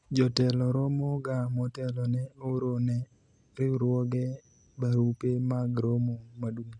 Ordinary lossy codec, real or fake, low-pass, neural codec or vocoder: none; real; 9.9 kHz; none